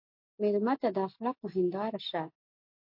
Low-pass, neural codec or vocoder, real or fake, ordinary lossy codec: 5.4 kHz; none; real; MP3, 32 kbps